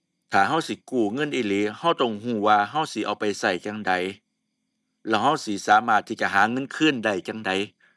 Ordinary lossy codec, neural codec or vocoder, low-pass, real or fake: none; none; none; real